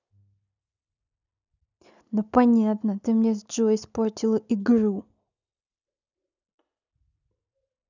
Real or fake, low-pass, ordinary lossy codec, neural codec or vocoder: real; 7.2 kHz; none; none